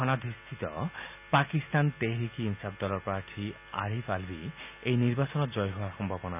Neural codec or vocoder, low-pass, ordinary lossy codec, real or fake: none; 3.6 kHz; none; real